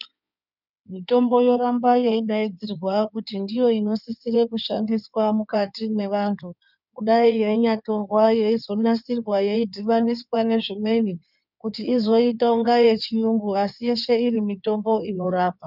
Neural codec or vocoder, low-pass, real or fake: codec, 16 kHz in and 24 kHz out, 2.2 kbps, FireRedTTS-2 codec; 5.4 kHz; fake